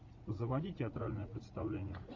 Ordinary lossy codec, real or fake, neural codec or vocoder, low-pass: MP3, 64 kbps; fake; vocoder, 44.1 kHz, 80 mel bands, Vocos; 7.2 kHz